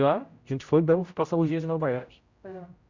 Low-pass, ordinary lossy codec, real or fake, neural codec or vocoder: 7.2 kHz; Opus, 64 kbps; fake; codec, 16 kHz, 0.5 kbps, X-Codec, HuBERT features, trained on general audio